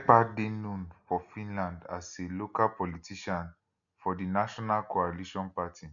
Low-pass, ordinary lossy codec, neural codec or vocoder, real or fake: 7.2 kHz; none; none; real